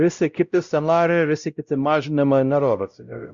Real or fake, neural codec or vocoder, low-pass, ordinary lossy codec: fake; codec, 16 kHz, 0.5 kbps, X-Codec, WavLM features, trained on Multilingual LibriSpeech; 7.2 kHz; Opus, 64 kbps